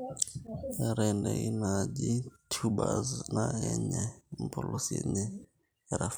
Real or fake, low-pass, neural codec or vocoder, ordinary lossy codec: fake; none; vocoder, 44.1 kHz, 128 mel bands every 256 samples, BigVGAN v2; none